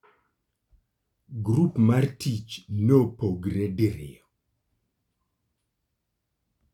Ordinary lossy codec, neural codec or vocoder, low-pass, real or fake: none; none; 19.8 kHz; real